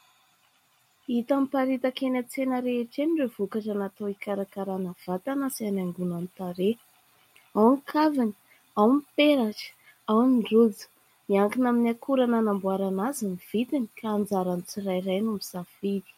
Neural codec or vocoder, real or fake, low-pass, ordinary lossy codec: none; real; 19.8 kHz; MP3, 64 kbps